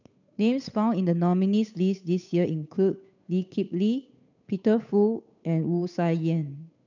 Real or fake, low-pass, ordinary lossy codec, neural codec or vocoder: fake; 7.2 kHz; none; codec, 16 kHz, 8 kbps, FunCodec, trained on Chinese and English, 25 frames a second